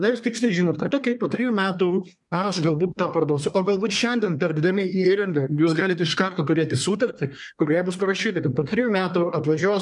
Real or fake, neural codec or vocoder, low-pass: fake; codec, 24 kHz, 1 kbps, SNAC; 10.8 kHz